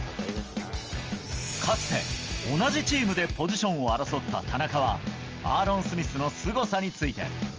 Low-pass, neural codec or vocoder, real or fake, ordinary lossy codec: 7.2 kHz; none; real; Opus, 24 kbps